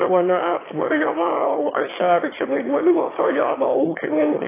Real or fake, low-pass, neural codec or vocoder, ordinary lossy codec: fake; 3.6 kHz; autoencoder, 22.05 kHz, a latent of 192 numbers a frame, VITS, trained on one speaker; AAC, 16 kbps